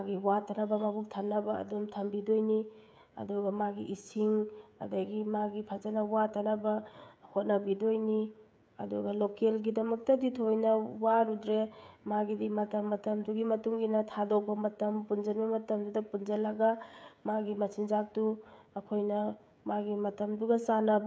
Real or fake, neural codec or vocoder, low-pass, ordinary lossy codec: fake; codec, 16 kHz, 16 kbps, FreqCodec, smaller model; none; none